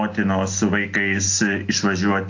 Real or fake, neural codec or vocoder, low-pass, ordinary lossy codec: real; none; 7.2 kHz; AAC, 48 kbps